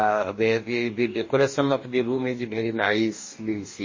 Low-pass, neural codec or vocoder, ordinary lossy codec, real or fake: 7.2 kHz; codec, 44.1 kHz, 2.6 kbps, DAC; MP3, 32 kbps; fake